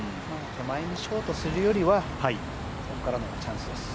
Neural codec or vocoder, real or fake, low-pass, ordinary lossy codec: none; real; none; none